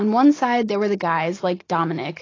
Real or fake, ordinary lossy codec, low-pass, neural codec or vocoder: real; AAC, 32 kbps; 7.2 kHz; none